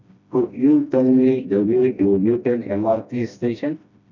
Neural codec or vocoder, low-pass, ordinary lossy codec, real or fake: codec, 16 kHz, 1 kbps, FreqCodec, smaller model; 7.2 kHz; none; fake